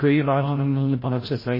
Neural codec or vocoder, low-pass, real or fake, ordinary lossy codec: codec, 16 kHz, 0.5 kbps, FreqCodec, larger model; 5.4 kHz; fake; MP3, 24 kbps